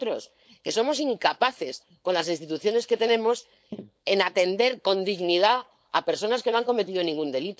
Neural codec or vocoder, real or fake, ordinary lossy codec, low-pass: codec, 16 kHz, 4.8 kbps, FACodec; fake; none; none